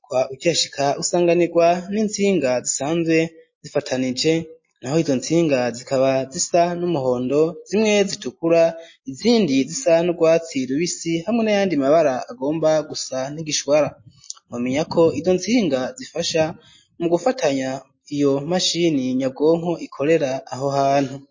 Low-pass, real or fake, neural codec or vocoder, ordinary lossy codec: 7.2 kHz; real; none; MP3, 32 kbps